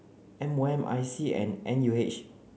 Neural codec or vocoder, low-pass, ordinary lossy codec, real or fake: none; none; none; real